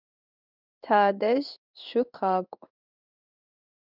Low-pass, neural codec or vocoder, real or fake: 5.4 kHz; codec, 16 kHz in and 24 kHz out, 1 kbps, XY-Tokenizer; fake